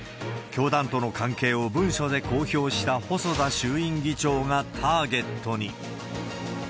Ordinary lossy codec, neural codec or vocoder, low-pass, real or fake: none; none; none; real